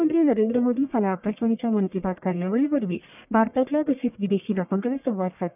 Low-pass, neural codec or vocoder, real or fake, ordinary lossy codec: 3.6 kHz; codec, 44.1 kHz, 1.7 kbps, Pupu-Codec; fake; none